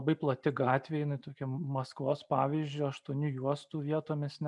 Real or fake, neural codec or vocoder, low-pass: real; none; 10.8 kHz